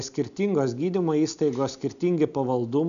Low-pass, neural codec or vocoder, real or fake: 7.2 kHz; none; real